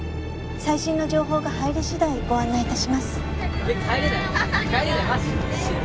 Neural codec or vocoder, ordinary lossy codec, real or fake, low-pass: none; none; real; none